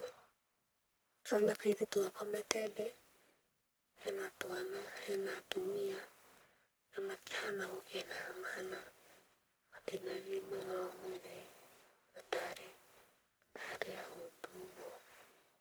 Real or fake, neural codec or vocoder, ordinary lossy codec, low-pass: fake; codec, 44.1 kHz, 1.7 kbps, Pupu-Codec; none; none